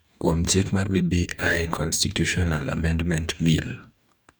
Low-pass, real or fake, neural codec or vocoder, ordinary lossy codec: none; fake; codec, 44.1 kHz, 2.6 kbps, DAC; none